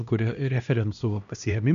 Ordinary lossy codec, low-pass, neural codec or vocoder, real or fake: AAC, 96 kbps; 7.2 kHz; codec, 16 kHz, 1 kbps, X-Codec, HuBERT features, trained on LibriSpeech; fake